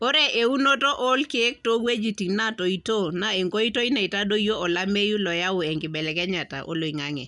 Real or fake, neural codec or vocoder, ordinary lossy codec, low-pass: real; none; none; 10.8 kHz